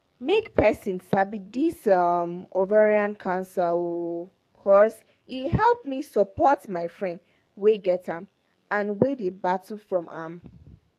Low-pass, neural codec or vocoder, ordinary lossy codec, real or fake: 14.4 kHz; codec, 44.1 kHz, 2.6 kbps, SNAC; AAC, 64 kbps; fake